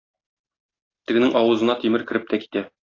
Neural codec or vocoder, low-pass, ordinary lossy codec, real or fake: none; 7.2 kHz; AAC, 32 kbps; real